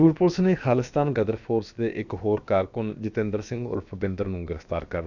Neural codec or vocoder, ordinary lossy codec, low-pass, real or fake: codec, 16 kHz, about 1 kbps, DyCAST, with the encoder's durations; none; 7.2 kHz; fake